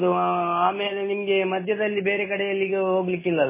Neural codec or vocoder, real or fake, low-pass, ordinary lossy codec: none; real; 3.6 kHz; MP3, 16 kbps